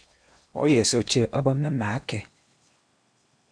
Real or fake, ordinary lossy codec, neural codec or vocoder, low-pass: fake; MP3, 96 kbps; codec, 16 kHz in and 24 kHz out, 0.8 kbps, FocalCodec, streaming, 65536 codes; 9.9 kHz